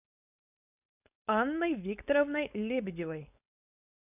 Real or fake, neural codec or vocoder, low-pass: fake; codec, 16 kHz, 4.8 kbps, FACodec; 3.6 kHz